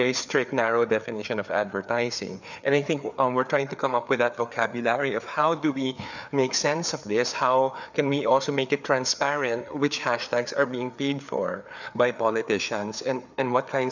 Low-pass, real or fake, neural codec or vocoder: 7.2 kHz; fake; codec, 16 kHz, 4 kbps, FreqCodec, larger model